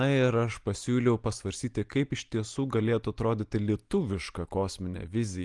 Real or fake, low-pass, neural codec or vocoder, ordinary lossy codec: real; 10.8 kHz; none; Opus, 24 kbps